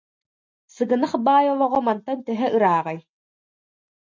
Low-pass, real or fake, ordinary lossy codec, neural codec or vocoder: 7.2 kHz; real; MP3, 48 kbps; none